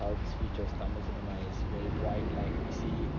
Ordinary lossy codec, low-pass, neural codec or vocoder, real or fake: none; 7.2 kHz; none; real